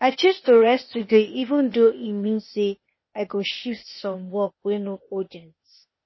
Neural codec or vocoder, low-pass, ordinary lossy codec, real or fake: codec, 16 kHz, 0.8 kbps, ZipCodec; 7.2 kHz; MP3, 24 kbps; fake